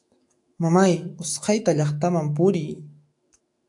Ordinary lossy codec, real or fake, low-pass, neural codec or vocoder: AAC, 64 kbps; fake; 10.8 kHz; autoencoder, 48 kHz, 128 numbers a frame, DAC-VAE, trained on Japanese speech